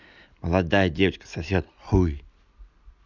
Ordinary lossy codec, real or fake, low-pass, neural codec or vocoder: none; real; 7.2 kHz; none